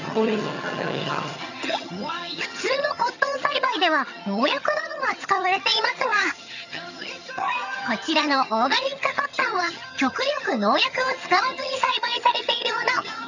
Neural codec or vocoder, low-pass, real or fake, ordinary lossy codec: vocoder, 22.05 kHz, 80 mel bands, HiFi-GAN; 7.2 kHz; fake; none